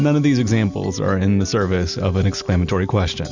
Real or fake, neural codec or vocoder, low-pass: real; none; 7.2 kHz